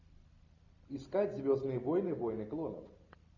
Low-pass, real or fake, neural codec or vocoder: 7.2 kHz; real; none